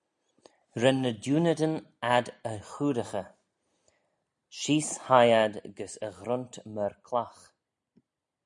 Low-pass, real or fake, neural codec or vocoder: 10.8 kHz; real; none